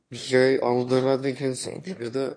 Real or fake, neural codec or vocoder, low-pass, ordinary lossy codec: fake; autoencoder, 22.05 kHz, a latent of 192 numbers a frame, VITS, trained on one speaker; 9.9 kHz; MP3, 48 kbps